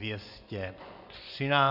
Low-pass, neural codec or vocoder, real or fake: 5.4 kHz; vocoder, 24 kHz, 100 mel bands, Vocos; fake